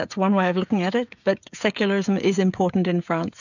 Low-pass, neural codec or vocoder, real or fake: 7.2 kHz; codec, 16 kHz, 16 kbps, FreqCodec, smaller model; fake